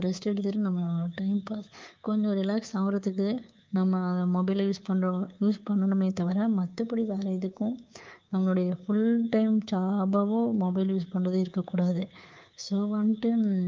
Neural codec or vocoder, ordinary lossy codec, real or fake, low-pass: codec, 24 kHz, 3.1 kbps, DualCodec; Opus, 32 kbps; fake; 7.2 kHz